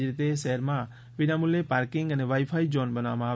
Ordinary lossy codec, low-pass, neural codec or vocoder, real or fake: none; none; none; real